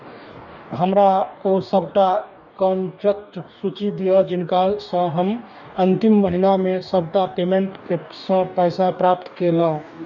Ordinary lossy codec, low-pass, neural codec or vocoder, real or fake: none; 7.2 kHz; codec, 44.1 kHz, 2.6 kbps, DAC; fake